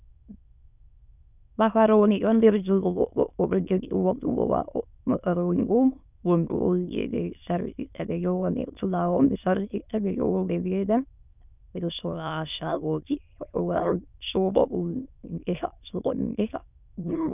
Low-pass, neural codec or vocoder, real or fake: 3.6 kHz; autoencoder, 22.05 kHz, a latent of 192 numbers a frame, VITS, trained on many speakers; fake